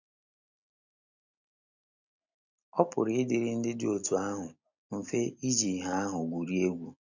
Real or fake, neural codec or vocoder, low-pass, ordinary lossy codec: real; none; 7.2 kHz; none